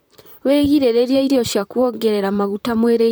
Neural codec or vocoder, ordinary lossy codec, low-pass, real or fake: vocoder, 44.1 kHz, 128 mel bands, Pupu-Vocoder; none; none; fake